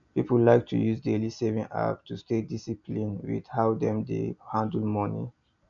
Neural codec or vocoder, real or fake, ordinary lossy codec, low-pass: none; real; none; 7.2 kHz